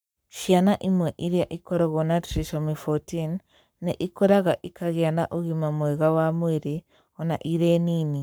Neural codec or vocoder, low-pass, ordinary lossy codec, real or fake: codec, 44.1 kHz, 7.8 kbps, Pupu-Codec; none; none; fake